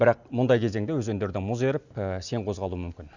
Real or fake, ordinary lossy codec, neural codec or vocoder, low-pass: real; none; none; 7.2 kHz